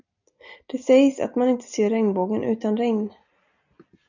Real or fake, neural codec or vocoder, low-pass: real; none; 7.2 kHz